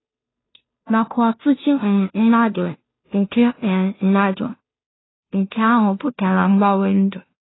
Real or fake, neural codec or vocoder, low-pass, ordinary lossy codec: fake; codec, 16 kHz, 0.5 kbps, FunCodec, trained on Chinese and English, 25 frames a second; 7.2 kHz; AAC, 16 kbps